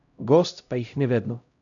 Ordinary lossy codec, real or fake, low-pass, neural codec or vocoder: none; fake; 7.2 kHz; codec, 16 kHz, 0.5 kbps, X-Codec, HuBERT features, trained on LibriSpeech